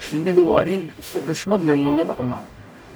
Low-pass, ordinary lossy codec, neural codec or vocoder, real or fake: none; none; codec, 44.1 kHz, 0.9 kbps, DAC; fake